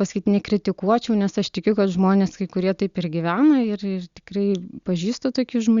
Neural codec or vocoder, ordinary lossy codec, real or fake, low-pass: none; Opus, 64 kbps; real; 7.2 kHz